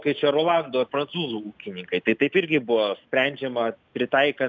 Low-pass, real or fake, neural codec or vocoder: 7.2 kHz; real; none